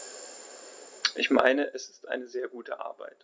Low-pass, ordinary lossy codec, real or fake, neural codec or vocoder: none; none; real; none